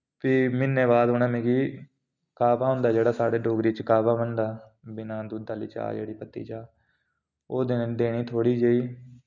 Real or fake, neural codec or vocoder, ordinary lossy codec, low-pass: real; none; none; 7.2 kHz